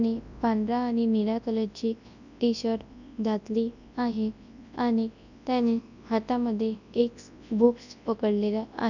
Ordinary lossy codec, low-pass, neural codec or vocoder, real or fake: none; 7.2 kHz; codec, 24 kHz, 0.9 kbps, WavTokenizer, large speech release; fake